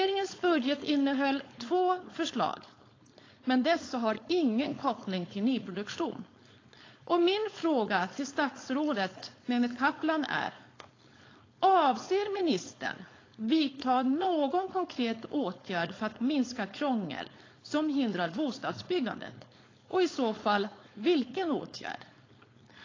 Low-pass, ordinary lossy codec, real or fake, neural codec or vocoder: 7.2 kHz; AAC, 32 kbps; fake; codec, 16 kHz, 4.8 kbps, FACodec